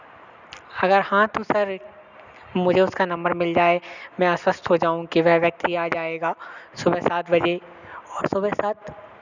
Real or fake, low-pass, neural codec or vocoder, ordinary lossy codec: real; 7.2 kHz; none; none